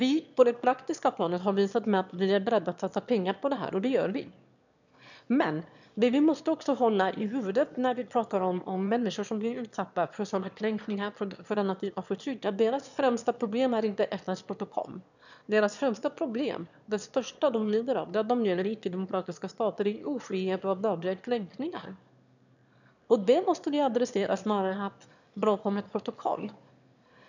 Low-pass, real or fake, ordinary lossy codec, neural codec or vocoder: 7.2 kHz; fake; none; autoencoder, 22.05 kHz, a latent of 192 numbers a frame, VITS, trained on one speaker